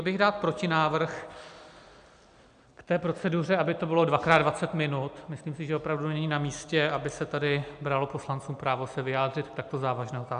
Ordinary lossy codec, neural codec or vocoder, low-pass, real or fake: AAC, 96 kbps; none; 9.9 kHz; real